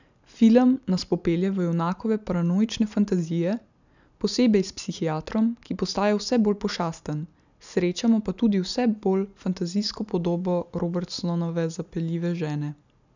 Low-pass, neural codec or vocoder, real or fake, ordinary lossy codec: 7.2 kHz; none; real; none